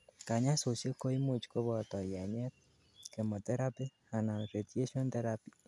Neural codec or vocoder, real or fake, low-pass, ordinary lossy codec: none; real; none; none